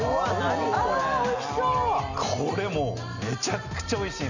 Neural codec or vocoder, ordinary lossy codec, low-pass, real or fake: none; none; 7.2 kHz; real